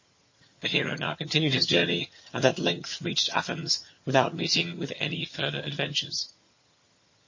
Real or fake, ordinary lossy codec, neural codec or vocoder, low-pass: fake; MP3, 32 kbps; vocoder, 22.05 kHz, 80 mel bands, HiFi-GAN; 7.2 kHz